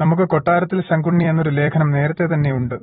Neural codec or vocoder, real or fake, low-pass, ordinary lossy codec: vocoder, 44.1 kHz, 128 mel bands every 256 samples, BigVGAN v2; fake; 19.8 kHz; AAC, 16 kbps